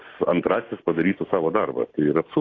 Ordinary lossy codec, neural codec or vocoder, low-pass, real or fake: AAC, 32 kbps; none; 7.2 kHz; real